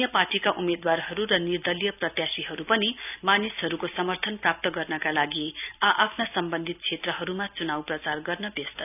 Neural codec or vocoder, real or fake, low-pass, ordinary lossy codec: none; real; 3.6 kHz; none